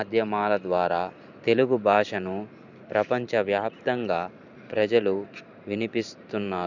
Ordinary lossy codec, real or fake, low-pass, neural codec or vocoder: none; fake; 7.2 kHz; vocoder, 44.1 kHz, 128 mel bands every 512 samples, BigVGAN v2